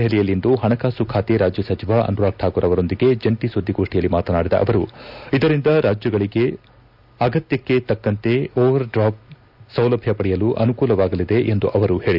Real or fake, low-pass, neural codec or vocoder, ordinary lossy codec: real; 5.4 kHz; none; none